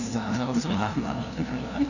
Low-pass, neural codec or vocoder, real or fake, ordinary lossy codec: 7.2 kHz; codec, 16 kHz, 0.5 kbps, FunCodec, trained on LibriTTS, 25 frames a second; fake; none